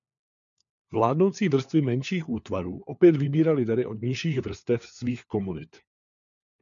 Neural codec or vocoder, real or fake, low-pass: codec, 16 kHz, 4 kbps, FunCodec, trained on LibriTTS, 50 frames a second; fake; 7.2 kHz